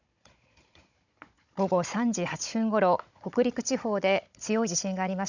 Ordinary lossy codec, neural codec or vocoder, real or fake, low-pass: none; codec, 16 kHz, 16 kbps, FunCodec, trained on Chinese and English, 50 frames a second; fake; 7.2 kHz